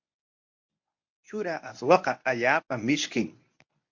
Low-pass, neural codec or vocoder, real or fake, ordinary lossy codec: 7.2 kHz; codec, 24 kHz, 0.9 kbps, WavTokenizer, medium speech release version 1; fake; MP3, 48 kbps